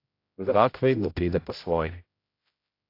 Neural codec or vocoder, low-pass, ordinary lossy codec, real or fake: codec, 16 kHz, 0.5 kbps, X-Codec, HuBERT features, trained on general audio; 5.4 kHz; AAC, 32 kbps; fake